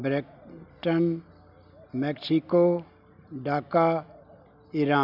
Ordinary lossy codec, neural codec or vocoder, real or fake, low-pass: none; none; real; 5.4 kHz